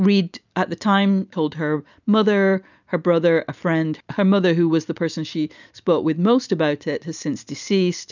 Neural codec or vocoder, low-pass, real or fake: none; 7.2 kHz; real